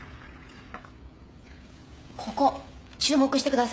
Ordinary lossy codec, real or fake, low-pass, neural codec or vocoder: none; fake; none; codec, 16 kHz, 16 kbps, FreqCodec, smaller model